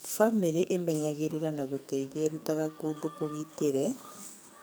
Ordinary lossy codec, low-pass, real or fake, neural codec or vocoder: none; none; fake; codec, 44.1 kHz, 2.6 kbps, SNAC